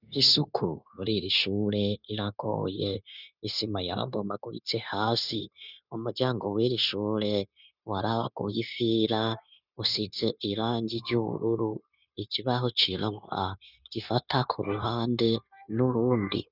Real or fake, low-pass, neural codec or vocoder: fake; 5.4 kHz; codec, 16 kHz, 0.9 kbps, LongCat-Audio-Codec